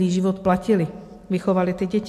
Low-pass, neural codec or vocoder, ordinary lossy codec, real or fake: 14.4 kHz; none; AAC, 64 kbps; real